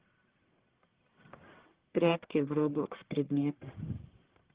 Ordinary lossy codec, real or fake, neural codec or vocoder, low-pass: Opus, 16 kbps; fake; codec, 44.1 kHz, 1.7 kbps, Pupu-Codec; 3.6 kHz